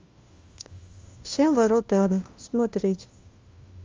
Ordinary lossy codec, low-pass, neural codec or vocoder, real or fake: Opus, 32 kbps; 7.2 kHz; codec, 16 kHz, 1 kbps, FunCodec, trained on LibriTTS, 50 frames a second; fake